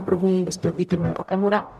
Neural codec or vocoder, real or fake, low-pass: codec, 44.1 kHz, 0.9 kbps, DAC; fake; 14.4 kHz